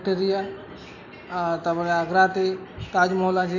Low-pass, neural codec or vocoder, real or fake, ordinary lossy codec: 7.2 kHz; none; real; none